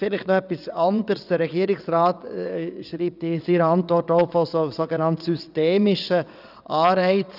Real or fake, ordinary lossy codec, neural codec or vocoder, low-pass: fake; none; vocoder, 22.05 kHz, 80 mel bands, WaveNeXt; 5.4 kHz